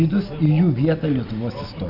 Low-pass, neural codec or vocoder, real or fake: 5.4 kHz; none; real